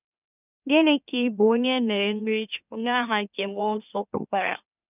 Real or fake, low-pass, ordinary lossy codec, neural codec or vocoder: fake; 3.6 kHz; none; autoencoder, 44.1 kHz, a latent of 192 numbers a frame, MeloTTS